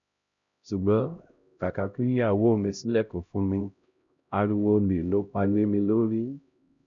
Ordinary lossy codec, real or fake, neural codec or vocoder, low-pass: none; fake; codec, 16 kHz, 0.5 kbps, X-Codec, HuBERT features, trained on LibriSpeech; 7.2 kHz